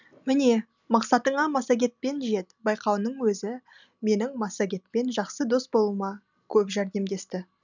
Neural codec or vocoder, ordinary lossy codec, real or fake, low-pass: none; none; real; 7.2 kHz